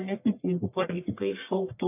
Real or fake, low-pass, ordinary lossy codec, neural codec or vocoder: fake; 3.6 kHz; AAC, 24 kbps; codec, 44.1 kHz, 1.7 kbps, Pupu-Codec